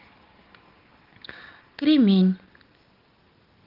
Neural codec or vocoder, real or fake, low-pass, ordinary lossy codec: none; real; 5.4 kHz; Opus, 16 kbps